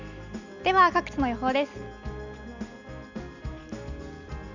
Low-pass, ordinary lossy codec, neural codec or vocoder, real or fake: 7.2 kHz; none; none; real